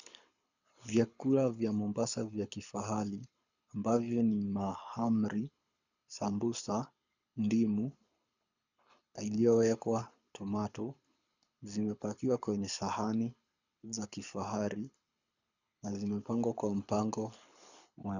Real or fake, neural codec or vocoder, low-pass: fake; codec, 24 kHz, 6 kbps, HILCodec; 7.2 kHz